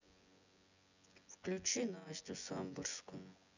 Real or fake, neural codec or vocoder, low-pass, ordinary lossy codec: fake; vocoder, 24 kHz, 100 mel bands, Vocos; 7.2 kHz; none